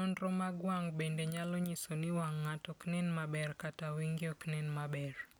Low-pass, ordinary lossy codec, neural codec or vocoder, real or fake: none; none; none; real